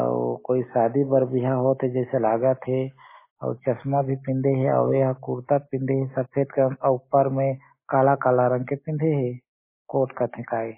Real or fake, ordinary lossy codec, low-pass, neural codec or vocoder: real; MP3, 16 kbps; 3.6 kHz; none